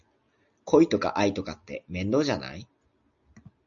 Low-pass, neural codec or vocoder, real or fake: 7.2 kHz; none; real